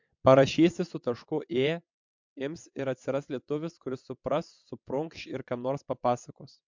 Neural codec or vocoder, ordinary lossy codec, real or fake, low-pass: vocoder, 22.05 kHz, 80 mel bands, WaveNeXt; MP3, 64 kbps; fake; 7.2 kHz